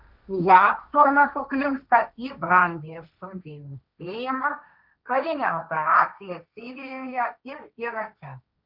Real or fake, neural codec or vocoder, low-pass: fake; codec, 16 kHz, 1.1 kbps, Voila-Tokenizer; 5.4 kHz